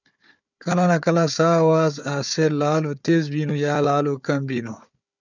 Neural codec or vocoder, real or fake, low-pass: codec, 16 kHz, 4 kbps, FunCodec, trained on Chinese and English, 50 frames a second; fake; 7.2 kHz